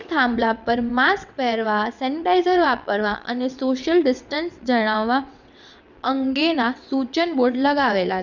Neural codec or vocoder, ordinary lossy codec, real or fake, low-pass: vocoder, 22.05 kHz, 80 mel bands, WaveNeXt; none; fake; 7.2 kHz